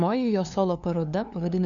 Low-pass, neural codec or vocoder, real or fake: 7.2 kHz; codec, 16 kHz, 2 kbps, FunCodec, trained on LibriTTS, 25 frames a second; fake